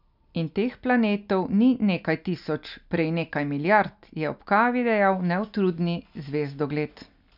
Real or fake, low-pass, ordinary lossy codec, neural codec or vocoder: real; 5.4 kHz; none; none